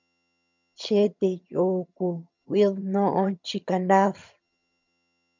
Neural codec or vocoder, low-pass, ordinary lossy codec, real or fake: vocoder, 22.05 kHz, 80 mel bands, HiFi-GAN; 7.2 kHz; MP3, 64 kbps; fake